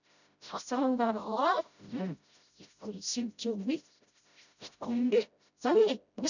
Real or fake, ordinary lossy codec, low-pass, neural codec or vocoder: fake; none; 7.2 kHz; codec, 16 kHz, 0.5 kbps, FreqCodec, smaller model